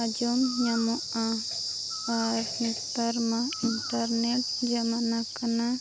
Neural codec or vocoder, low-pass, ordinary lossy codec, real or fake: none; none; none; real